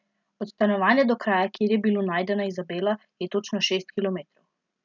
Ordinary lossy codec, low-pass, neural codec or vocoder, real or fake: none; 7.2 kHz; none; real